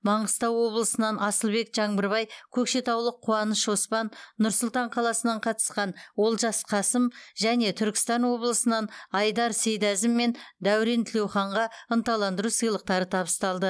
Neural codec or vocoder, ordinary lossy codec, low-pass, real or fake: none; none; none; real